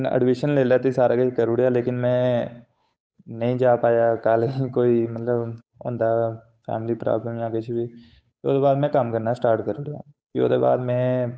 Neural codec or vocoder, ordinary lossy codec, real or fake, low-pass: codec, 16 kHz, 8 kbps, FunCodec, trained on Chinese and English, 25 frames a second; none; fake; none